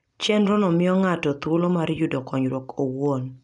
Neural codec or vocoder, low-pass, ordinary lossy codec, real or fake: none; 10.8 kHz; MP3, 96 kbps; real